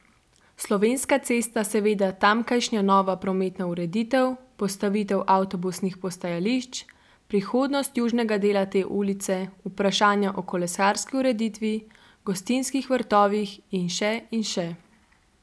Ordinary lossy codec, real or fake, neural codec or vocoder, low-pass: none; real; none; none